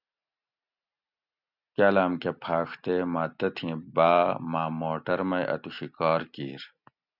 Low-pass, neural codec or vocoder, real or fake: 5.4 kHz; none; real